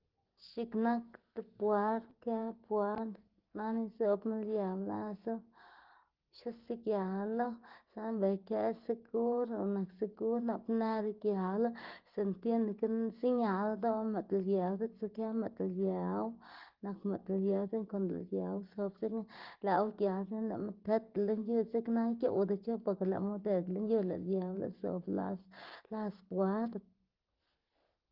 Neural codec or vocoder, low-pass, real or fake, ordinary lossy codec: none; 5.4 kHz; real; Opus, 16 kbps